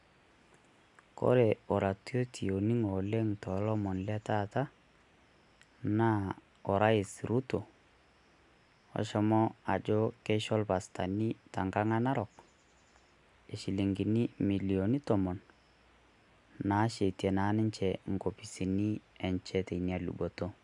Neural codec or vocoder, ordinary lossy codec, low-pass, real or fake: none; none; 10.8 kHz; real